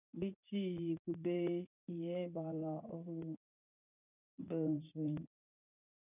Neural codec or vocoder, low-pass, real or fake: vocoder, 44.1 kHz, 80 mel bands, Vocos; 3.6 kHz; fake